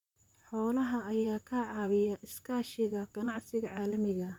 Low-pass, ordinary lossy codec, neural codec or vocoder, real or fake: 19.8 kHz; none; vocoder, 44.1 kHz, 128 mel bands, Pupu-Vocoder; fake